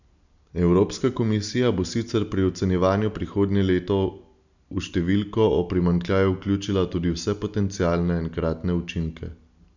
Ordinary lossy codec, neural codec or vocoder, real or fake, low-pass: none; none; real; 7.2 kHz